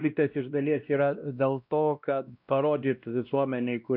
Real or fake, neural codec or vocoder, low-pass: fake; codec, 16 kHz, 1 kbps, X-Codec, WavLM features, trained on Multilingual LibriSpeech; 5.4 kHz